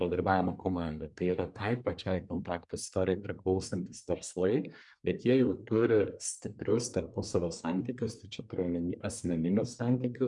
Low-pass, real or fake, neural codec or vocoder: 10.8 kHz; fake; codec, 24 kHz, 1 kbps, SNAC